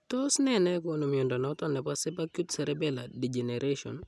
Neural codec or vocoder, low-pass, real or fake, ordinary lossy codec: none; none; real; none